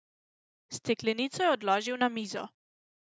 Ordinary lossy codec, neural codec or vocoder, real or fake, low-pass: none; none; real; none